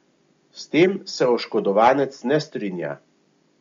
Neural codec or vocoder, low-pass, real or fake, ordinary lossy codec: none; 7.2 kHz; real; MP3, 48 kbps